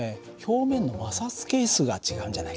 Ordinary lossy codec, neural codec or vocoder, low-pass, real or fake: none; none; none; real